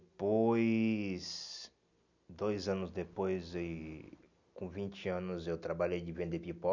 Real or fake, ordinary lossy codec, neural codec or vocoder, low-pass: real; none; none; 7.2 kHz